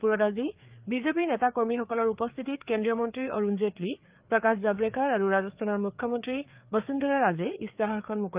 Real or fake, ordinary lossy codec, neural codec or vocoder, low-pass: fake; Opus, 24 kbps; codec, 16 kHz, 4 kbps, FreqCodec, larger model; 3.6 kHz